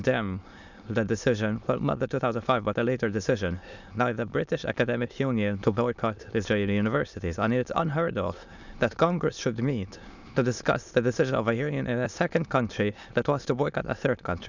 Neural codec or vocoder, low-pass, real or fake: autoencoder, 22.05 kHz, a latent of 192 numbers a frame, VITS, trained on many speakers; 7.2 kHz; fake